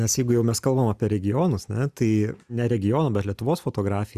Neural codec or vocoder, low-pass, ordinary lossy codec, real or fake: none; 14.4 kHz; Opus, 64 kbps; real